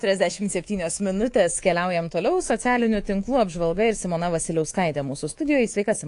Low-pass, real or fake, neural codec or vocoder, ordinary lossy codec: 10.8 kHz; fake; codec, 24 kHz, 3.1 kbps, DualCodec; AAC, 48 kbps